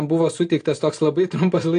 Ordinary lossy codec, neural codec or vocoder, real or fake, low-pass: AAC, 48 kbps; none; real; 9.9 kHz